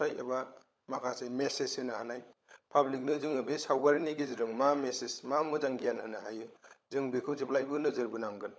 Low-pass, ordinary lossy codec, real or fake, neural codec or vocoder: none; none; fake; codec, 16 kHz, 16 kbps, FunCodec, trained on LibriTTS, 50 frames a second